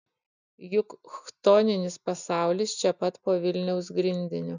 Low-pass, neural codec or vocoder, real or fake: 7.2 kHz; none; real